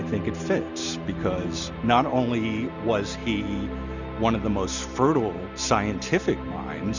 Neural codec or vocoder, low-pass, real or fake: none; 7.2 kHz; real